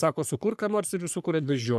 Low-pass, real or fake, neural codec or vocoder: 14.4 kHz; fake; codec, 44.1 kHz, 3.4 kbps, Pupu-Codec